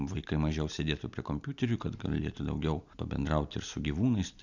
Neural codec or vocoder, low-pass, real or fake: none; 7.2 kHz; real